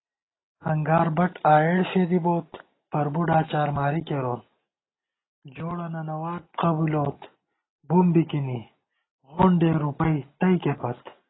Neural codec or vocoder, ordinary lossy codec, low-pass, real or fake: none; AAC, 16 kbps; 7.2 kHz; real